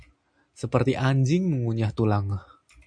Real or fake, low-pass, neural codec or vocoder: real; 9.9 kHz; none